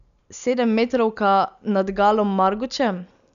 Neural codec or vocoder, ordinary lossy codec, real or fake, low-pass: none; none; real; 7.2 kHz